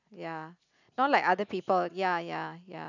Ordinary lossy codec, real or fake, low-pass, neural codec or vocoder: none; real; 7.2 kHz; none